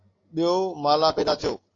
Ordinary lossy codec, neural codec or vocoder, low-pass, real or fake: AAC, 32 kbps; none; 7.2 kHz; real